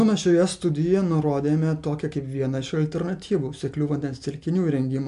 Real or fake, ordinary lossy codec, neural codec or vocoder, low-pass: real; MP3, 64 kbps; none; 10.8 kHz